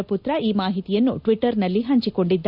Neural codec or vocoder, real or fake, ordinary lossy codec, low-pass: none; real; none; 5.4 kHz